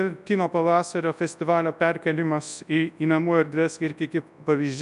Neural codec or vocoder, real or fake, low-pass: codec, 24 kHz, 0.9 kbps, WavTokenizer, large speech release; fake; 10.8 kHz